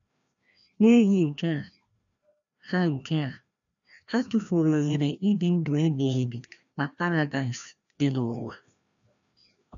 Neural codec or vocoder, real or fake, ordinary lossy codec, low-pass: codec, 16 kHz, 1 kbps, FreqCodec, larger model; fake; none; 7.2 kHz